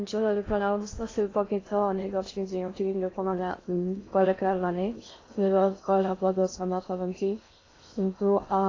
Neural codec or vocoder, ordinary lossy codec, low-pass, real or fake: codec, 16 kHz in and 24 kHz out, 0.6 kbps, FocalCodec, streaming, 2048 codes; AAC, 32 kbps; 7.2 kHz; fake